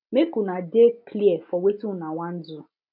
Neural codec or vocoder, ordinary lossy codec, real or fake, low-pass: none; MP3, 48 kbps; real; 5.4 kHz